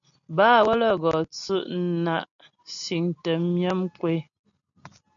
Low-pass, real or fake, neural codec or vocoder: 7.2 kHz; real; none